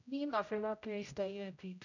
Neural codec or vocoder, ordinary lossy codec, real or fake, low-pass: codec, 16 kHz, 0.5 kbps, X-Codec, HuBERT features, trained on general audio; none; fake; 7.2 kHz